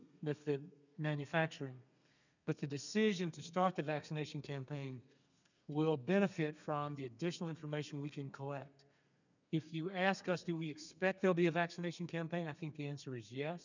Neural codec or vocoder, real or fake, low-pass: codec, 32 kHz, 1.9 kbps, SNAC; fake; 7.2 kHz